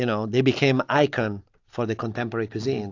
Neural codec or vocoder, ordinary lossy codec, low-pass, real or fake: none; AAC, 48 kbps; 7.2 kHz; real